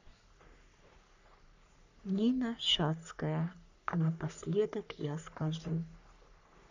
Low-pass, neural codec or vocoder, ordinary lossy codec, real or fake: 7.2 kHz; codec, 44.1 kHz, 3.4 kbps, Pupu-Codec; none; fake